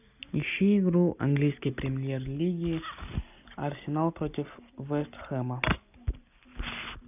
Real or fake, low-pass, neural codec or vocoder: real; 3.6 kHz; none